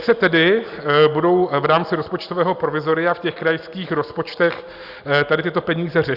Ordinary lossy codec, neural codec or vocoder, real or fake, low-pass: Opus, 64 kbps; none; real; 5.4 kHz